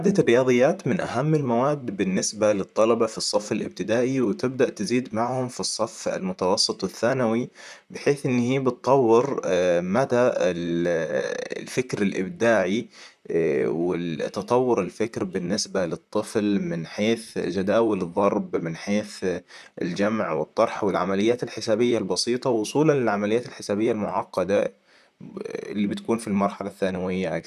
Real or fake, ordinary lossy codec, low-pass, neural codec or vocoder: fake; none; 14.4 kHz; vocoder, 44.1 kHz, 128 mel bands, Pupu-Vocoder